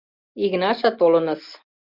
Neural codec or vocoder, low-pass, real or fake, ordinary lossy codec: none; 5.4 kHz; real; Opus, 64 kbps